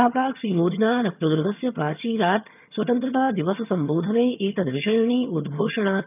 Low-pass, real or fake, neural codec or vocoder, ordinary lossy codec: 3.6 kHz; fake; vocoder, 22.05 kHz, 80 mel bands, HiFi-GAN; none